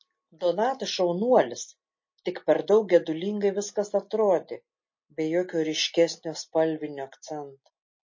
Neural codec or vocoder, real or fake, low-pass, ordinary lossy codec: none; real; 7.2 kHz; MP3, 32 kbps